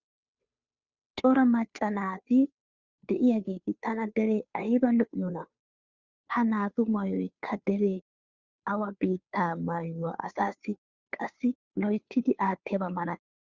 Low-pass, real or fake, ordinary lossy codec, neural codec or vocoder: 7.2 kHz; fake; Opus, 64 kbps; codec, 16 kHz, 2 kbps, FunCodec, trained on Chinese and English, 25 frames a second